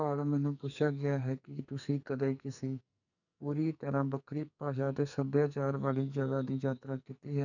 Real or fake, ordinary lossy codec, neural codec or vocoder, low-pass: fake; none; codec, 44.1 kHz, 2.6 kbps, SNAC; 7.2 kHz